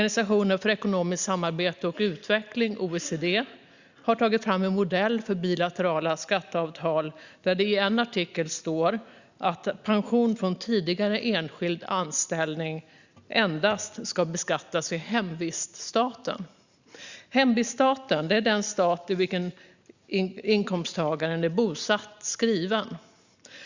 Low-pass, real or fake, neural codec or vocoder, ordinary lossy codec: 7.2 kHz; real; none; Opus, 64 kbps